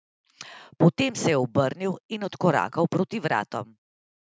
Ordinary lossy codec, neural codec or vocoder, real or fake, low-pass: none; none; real; none